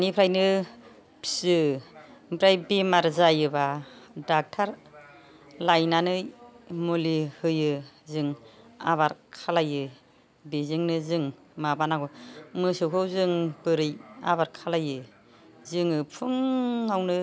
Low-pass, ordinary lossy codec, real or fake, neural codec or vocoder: none; none; real; none